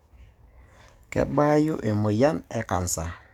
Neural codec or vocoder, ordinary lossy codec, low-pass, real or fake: codec, 44.1 kHz, 7.8 kbps, DAC; none; 19.8 kHz; fake